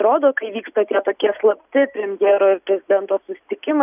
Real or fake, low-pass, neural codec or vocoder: real; 3.6 kHz; none